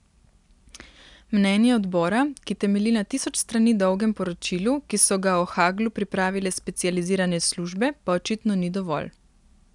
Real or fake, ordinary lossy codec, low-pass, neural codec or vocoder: real; none; 10.8 kHz; none